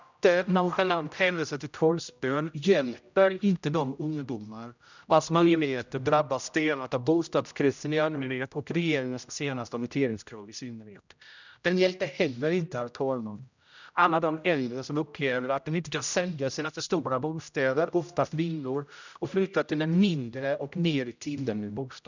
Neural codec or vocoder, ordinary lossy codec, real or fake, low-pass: codec, 16 kHz, 0.5 kbps, X-Codec, HuBERT features, trained on general audio; none; fake; 7.2 kHz